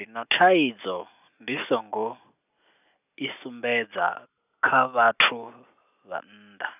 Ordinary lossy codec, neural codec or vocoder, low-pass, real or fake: none; none; 3.6 kHz; real